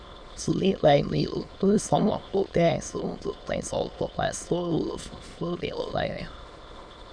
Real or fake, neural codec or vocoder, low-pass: fake; autoencoder, 22.05 kHz, a latent of 192 numbers a frame, VITS, trained on many speakers; 9.9 kHz